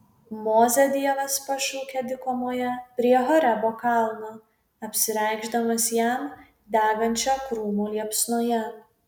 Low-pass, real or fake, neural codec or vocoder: 19.8 kHz; real; none